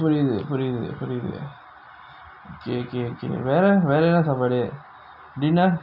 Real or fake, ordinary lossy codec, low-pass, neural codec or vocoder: real; none; 5.4 kHz; none